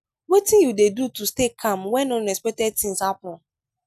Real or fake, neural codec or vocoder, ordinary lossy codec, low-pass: real; none; none; 14.4 kHz